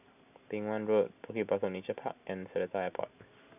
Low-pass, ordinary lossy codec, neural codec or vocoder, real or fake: 3.6 kHz; none; none; real